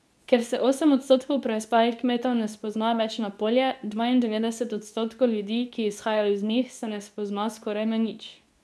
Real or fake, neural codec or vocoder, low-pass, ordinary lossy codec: fake; codec, 24 kHz, 0.9 kbps, WavTokenizer, medium speech release version 2; none; none